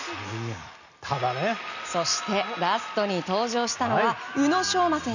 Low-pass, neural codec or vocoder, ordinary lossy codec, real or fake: 7.2 kHz; none; none; real